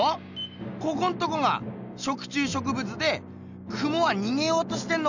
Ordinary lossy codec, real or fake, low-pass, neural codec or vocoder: none; real; 7.2 kHz; none